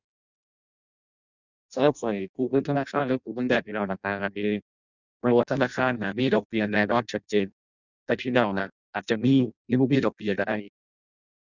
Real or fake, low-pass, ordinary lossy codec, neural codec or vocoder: fake; 7.2 kHz; none; codec, 16 kHz in and 24 kHz out, 0.6 kbps, FireRedTTS-2 codec